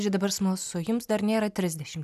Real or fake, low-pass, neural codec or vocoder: real; 14.4 kHz; none